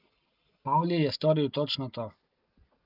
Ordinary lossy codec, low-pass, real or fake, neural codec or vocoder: Opus, 24 kbps; 5.4 kHz; real; none